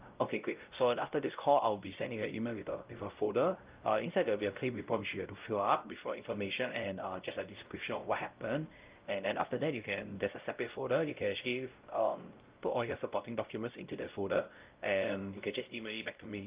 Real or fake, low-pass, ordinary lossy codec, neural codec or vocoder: fake; 3.6 kHz; Opus, 24 kbps; codec, 16 kHz, 0.5 kbps, X-Codec, WavLM features, trained on Multilingual LibriSpeech